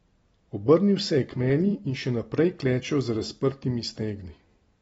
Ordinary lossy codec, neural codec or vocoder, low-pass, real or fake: AAC, 24 kbps; none; 19.8 kHz; real